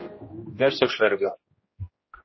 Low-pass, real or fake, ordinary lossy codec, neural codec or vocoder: 7.2 kHz; fake; MP3, 24 kbps; codec, 16 kHz, 1 kbps, X-Codec, HuBERT features, trained on general audio